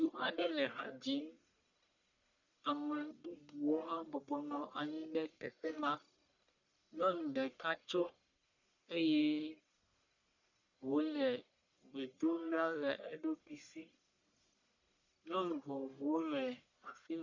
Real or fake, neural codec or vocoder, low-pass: fake; codec, 44.1 kHz, 1.7 kbps, Pupu-Codec; 7.2 kHz